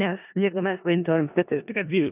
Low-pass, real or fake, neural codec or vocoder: 3.6 kHz; fake; codec, 16 kHz in and 24 kHz out, 0.4 kbps, LongCat-Audio-Codec, four codebook decoder